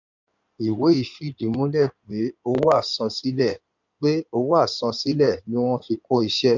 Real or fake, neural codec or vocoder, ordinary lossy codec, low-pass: fake; codec, 16 kHz in and 24 kHz out, 2.2 kbps, FireRedTTS-2 codec; none; 7.2 kHz